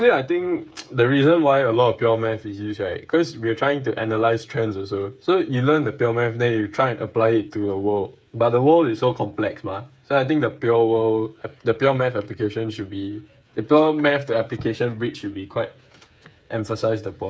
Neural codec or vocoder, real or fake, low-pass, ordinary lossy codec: codec, 16 kHz, 8 kbps, FreqCodec, smaller model; fake; none; none